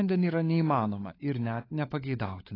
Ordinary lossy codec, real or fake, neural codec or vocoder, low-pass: AAC, 24 kbps; fake; codec, 16 kHz, 16 kbps, FunCodec, trained on LibriTTS, 50 frames a second; 5.4 kHz